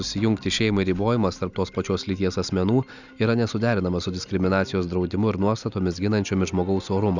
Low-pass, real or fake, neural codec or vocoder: 7.2 kHz; real; none